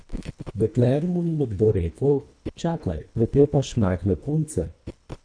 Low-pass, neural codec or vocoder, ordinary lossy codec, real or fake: 9.9 kHz; codec, 24 kHz, 1.5 kbps, HILCodec; none; fake